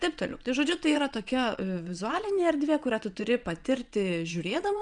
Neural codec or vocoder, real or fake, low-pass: vocoder, 22.05 kHz, 80 mel bands, WaveNeXt; fake; 9.9 kHz